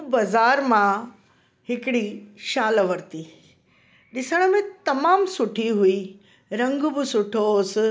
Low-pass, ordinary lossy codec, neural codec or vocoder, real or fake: none; none; none; real